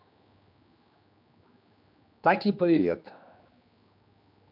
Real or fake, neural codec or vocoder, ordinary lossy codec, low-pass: fake; codec, 16 kHz, 2 kbps, X-Codec, HuBERT features, trained on general audio; MP3, 48 kbps; 5.4 kHz